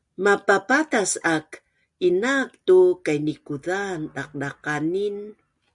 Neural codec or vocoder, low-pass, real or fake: none; 10.8 kHz; real